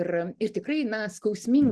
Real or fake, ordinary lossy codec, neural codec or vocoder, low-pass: real; Opus, 16 kbps; none; 10.8 kHz